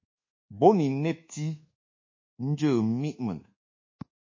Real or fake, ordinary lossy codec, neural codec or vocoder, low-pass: fake; MP3, 32 kbps; codec, 24 kHz, 1.2 kbps, DualCodec; 7.2 kHz